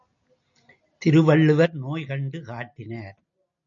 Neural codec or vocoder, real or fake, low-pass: none; real; 7.2 kHz